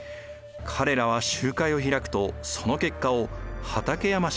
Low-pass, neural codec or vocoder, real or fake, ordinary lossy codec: none; none; real; none